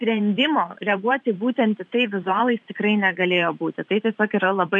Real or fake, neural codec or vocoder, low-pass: fake; vocoder, 44.1 kHz, 128 mel bands every 512 samples, BigVGAN v2; 10.8 kHz